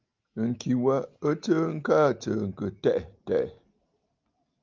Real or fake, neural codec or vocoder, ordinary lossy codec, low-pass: real; none; Opus, 24 kbps; 7.2 kHz